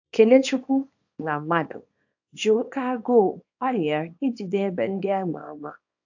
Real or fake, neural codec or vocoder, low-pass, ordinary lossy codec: fake; codec, 24 kHz, 0.9 kbps, WavTokenizer, small release; 7.2 kHz; AAC, 48 kbps